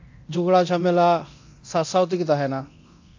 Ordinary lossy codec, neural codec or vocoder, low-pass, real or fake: AAC, 48 kbps; codec, 24 kHz, 0.9 kbps, DualCodec; 7.2 kHz; fake